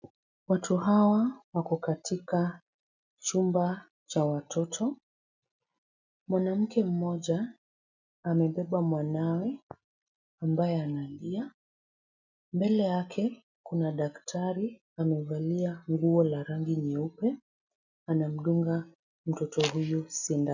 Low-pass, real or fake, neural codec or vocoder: 7.2 kHz; real; none